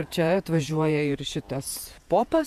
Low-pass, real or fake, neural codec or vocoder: 14.4 kHz; fake; vocoder, 48 kHz, 128 mel bands, Vocos